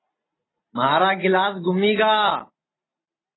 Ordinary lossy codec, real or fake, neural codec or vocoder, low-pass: AAC, 16 kbps; real; none; 7.2 kHz